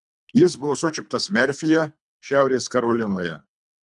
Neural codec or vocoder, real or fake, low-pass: codec, 24 kHz, 3 kbps, HILCodec; fake; 10.8 kHz